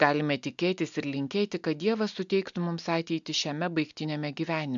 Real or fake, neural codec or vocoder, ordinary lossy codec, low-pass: real; none; MP3, 64 kbps; 7.2 kHz